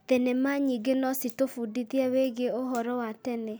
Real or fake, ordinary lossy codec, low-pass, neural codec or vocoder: real; none; none; none